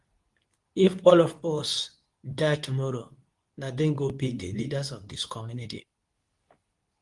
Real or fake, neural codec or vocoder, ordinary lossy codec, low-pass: fake; codec, 24 kHz, 0.9 kbps, WavTokenizer, medium speech release version 2; Opus, 24 kbps; 10.8 kHz